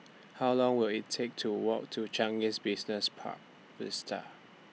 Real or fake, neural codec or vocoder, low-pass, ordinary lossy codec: real; none; none; none